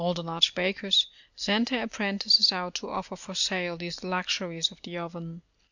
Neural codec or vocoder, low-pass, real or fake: none; 7.2 kHz; real